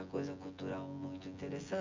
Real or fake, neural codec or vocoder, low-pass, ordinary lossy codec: fake; vocoder, 24 kHz, 100 mel bands, Vocos; 7.2 kHz; MP3, 64 kbps